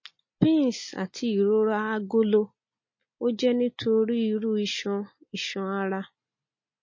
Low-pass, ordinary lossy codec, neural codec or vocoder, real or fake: 7.2 kHz; MP3, 32 kbps; none; real